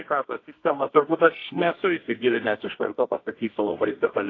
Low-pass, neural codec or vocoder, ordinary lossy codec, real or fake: 7.2 kHz; codec, 24 kHz, 0.9 kbps, WavTokenizer, medium music audio release; AAC, 32 kbps; fake